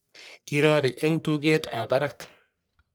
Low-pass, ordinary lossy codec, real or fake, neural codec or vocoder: none; none; fake; codec, 44.1 kHz, 1.7 kbps, Pupu-Codec